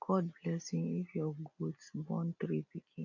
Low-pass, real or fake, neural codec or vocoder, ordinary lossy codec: 7.2 kHz; real; none; none